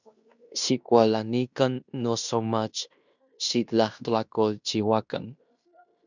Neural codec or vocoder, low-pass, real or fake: codec, 16 kHz in and 24 kHz out, 0.9 kbps, LongCat-Audio-Codec, fine tuned four codebook decoder; 7.2 kHz; fake